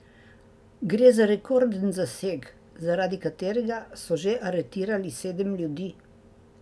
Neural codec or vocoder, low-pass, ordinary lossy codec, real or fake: none; none; none; real